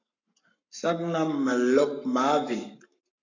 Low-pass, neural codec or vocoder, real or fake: 7.2 kHz; codec, 44.1 kHz, 7.8 kbps, Pupu-Codec; fake